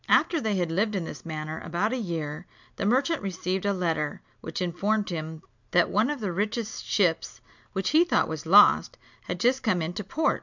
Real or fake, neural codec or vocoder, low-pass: real; none; 7.2 kHz